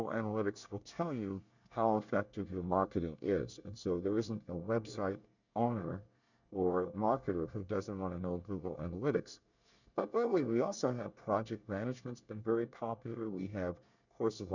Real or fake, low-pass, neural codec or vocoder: fake; 7.2 kHz; codec, 24 kHz, 1 kbps, SNAC